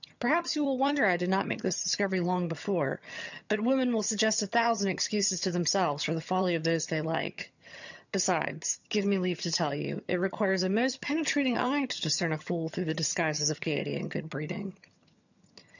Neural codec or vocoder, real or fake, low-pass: vocoder, 22.05 kHz, 80 mel bands, HiFi-GAN; fake; 7.2 kHz